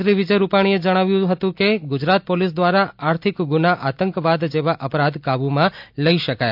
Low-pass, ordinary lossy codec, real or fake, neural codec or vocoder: 5.4 kHz; MP3, 48 kbps; real; none